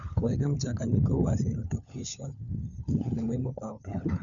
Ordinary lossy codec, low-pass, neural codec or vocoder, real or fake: none; 7.2 kHz; codec, 16 kHz, 4 kbps, FunCodec, trained on LibriTTS, 50 frames a second; fake